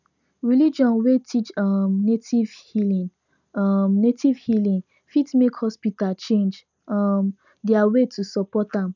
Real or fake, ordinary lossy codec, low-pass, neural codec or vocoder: real; none; 7.2 kHz; none